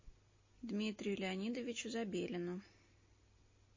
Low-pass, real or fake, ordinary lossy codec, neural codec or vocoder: 7.2 kHz; real; MP3, 32 kbps; none